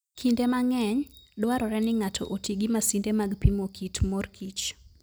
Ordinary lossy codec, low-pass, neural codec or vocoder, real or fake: none; none; none; real